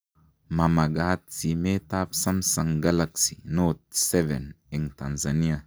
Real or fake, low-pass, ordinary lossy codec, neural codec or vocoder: real; none; none; none